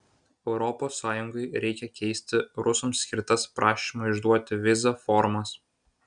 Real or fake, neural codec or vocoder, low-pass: real; none; 9.9 kHz